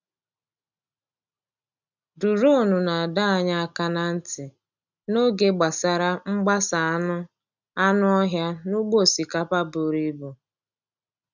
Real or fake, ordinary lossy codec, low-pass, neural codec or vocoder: real; none; 7.2 kHz; none